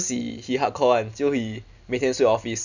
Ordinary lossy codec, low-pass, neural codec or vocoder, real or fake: none; 7.2 kHz; none; real